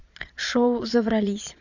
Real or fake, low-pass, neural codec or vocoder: fake; 7.2 kHz; vocoder, 22.05 kHz, 80 mel bands, Vocos